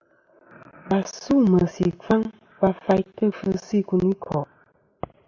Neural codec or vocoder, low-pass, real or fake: none; 7.2 kHz; real